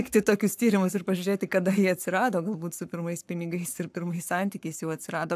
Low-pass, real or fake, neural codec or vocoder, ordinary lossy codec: 14.4 kHz; fake; codec, 44.1 kHz, 7.8 kbps, Pupu-Codec; AAC, 96 kbps